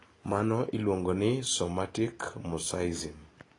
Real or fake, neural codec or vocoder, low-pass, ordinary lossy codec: real; none; 10.8 kHz; AAC, 32 kbps